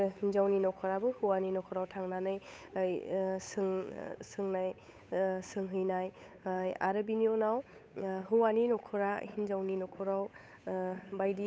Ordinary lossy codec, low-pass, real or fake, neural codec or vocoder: none; none; fake; codec, 16 kHz, 8 kbps, FunCodec, trained on Chinese and English, 25 frames a second